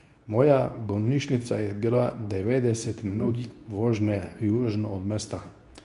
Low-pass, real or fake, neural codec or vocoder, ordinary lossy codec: 10.8 kHz; fake; codec, 24 kHz, 0.9 kbps, WavTokenizer, medium speech release version 2; none